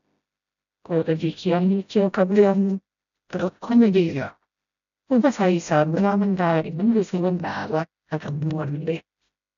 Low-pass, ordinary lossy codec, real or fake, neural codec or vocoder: 7.2 kHz; none; fake; codec, 16 kHz, 0.5 kbps, FreqCodec, smaller model